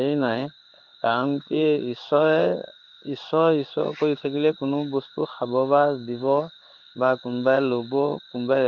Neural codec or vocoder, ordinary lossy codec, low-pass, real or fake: codec, 16 kHz in and 24 kHz out, 1 kbps, XY-Tokenizer; Opus, 32 kbps; 7.2 kHz; fake